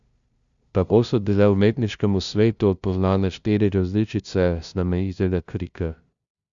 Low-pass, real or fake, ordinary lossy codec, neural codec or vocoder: 7.2 kHz; fake; Opus, 64 kbps; codec, 16 kHz, 0.5 kbps, FunCodec, trained on LibriTTS, 25 frames a second